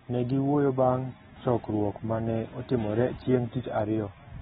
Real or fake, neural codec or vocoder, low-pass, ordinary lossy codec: real; none; 19.8 kHz; AAC, 16 kbps